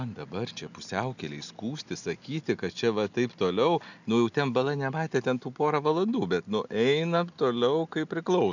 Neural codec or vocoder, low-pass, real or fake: none; 7.2 kHz; real